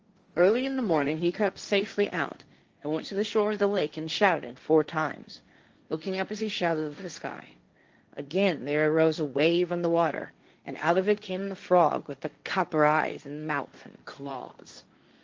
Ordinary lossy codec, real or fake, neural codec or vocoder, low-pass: Opus, 24 kbps; fake; codec, 16 kHz, 1.1 kbps, Voila-Tokenizer; 7.2 kHz